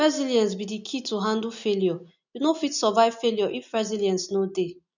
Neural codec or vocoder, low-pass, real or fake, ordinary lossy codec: none; 7.2 kHz; real; none